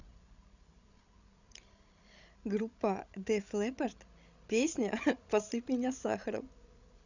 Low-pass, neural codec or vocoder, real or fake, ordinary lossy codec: 7.2 kHz; codec, 16 kHz, 16 kbps, FreqCodec, larger model; fake; none